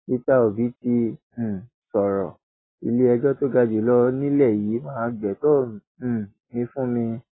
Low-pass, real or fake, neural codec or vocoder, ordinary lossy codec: 7.2 kHz; real; none; AAC, 16 kbps